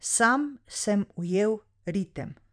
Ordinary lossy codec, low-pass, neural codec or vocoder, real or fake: none; 9.9 kHz; vocoder, 44.1 kHz, 128 mel bands every 512 samples, BigVGAN v2; fake